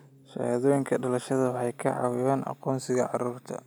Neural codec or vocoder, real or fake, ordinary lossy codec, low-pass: none; real; none; none